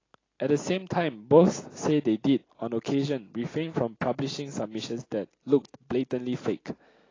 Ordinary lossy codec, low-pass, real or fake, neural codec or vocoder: AAC, 32 kbps; 7.2 kHz; real; none